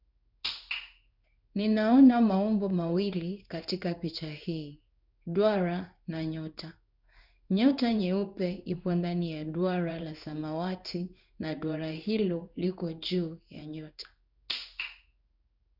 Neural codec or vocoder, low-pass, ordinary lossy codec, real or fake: codec, 16 kHz in and 24 kHz out, 1 kbps, XY-Tokenizer; 5.4 kHz; none; fake